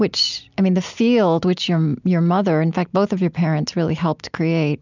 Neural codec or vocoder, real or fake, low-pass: none; real; 7.2 kHz